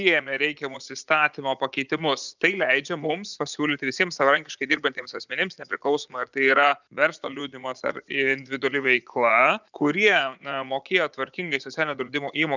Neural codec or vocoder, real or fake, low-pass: vocoder, 44.1 kHz, 80 mel bands, Vocos; fake; 7.2 kHz